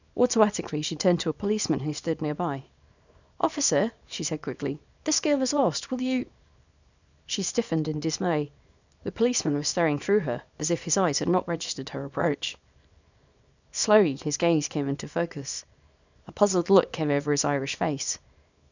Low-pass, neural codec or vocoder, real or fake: 7.2 kHz; codec, 24 kHz, 0.9 kbps, WavTokenizer, small release; fake